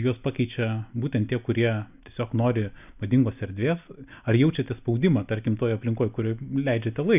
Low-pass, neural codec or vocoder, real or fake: 3.6 kHz; none; real